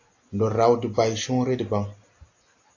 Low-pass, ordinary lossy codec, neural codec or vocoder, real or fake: 7.2 kHz; AAC, 48 kbps; none; real